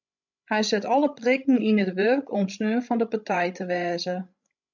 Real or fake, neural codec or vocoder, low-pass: fake; codec, 16 kHz, 16 kbps, FreqCodec, larger model; 7.2 kHz